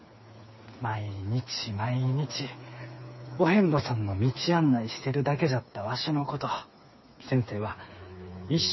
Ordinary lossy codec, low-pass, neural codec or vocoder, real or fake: MP3, 24 kbps; 7.2 kHz; codec, 16 kHz, 4 kbps, FreqCodec, smaller model; fake